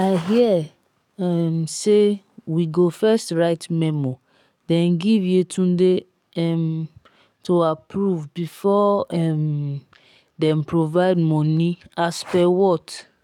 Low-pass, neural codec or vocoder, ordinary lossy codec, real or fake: 19.8 kHz; codec, 44.1 kHz, 7.8 kbps, DAC; none; fake